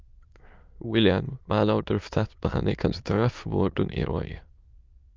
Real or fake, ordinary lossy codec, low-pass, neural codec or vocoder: fake; Opus, 24 kbps; 7.2 kHz; autoencoder, 22.05 kHz, a latent of 192 numbers a frame, VITS, trained on many speakers